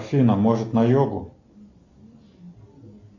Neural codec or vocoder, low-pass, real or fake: none; 7.2 kHz; real